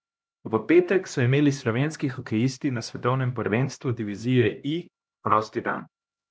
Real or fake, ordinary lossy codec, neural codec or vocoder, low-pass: fake; none; codec, 16 kHz, 1 kbps, X-Codec, HuBERT features, trained on LibriSpeech; none